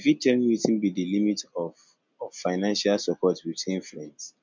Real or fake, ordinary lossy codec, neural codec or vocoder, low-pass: real; MP3, 64 kbps; none; 7.2 kHz